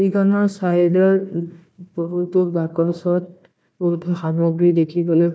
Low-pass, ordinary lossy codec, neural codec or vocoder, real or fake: none; none; codec, 16 kHz, 1 kbps, FunCodec, trained on Chinese and English, 50 frames a second; fake